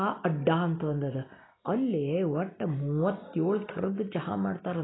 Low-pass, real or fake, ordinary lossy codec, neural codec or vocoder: 7.2 kHz; real; AAC, 16 kbps; none